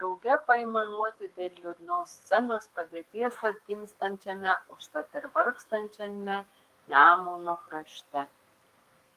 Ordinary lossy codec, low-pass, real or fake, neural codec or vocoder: Opus, 32 kbps; 14.4 kHz; fake; codec, 32 kHz, 1.9 kbps, SNAC